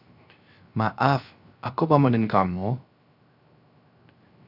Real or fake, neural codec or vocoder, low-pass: fake; codec, 16 kHz, 0.3 kbps, FocalCodec; 5.4 kHz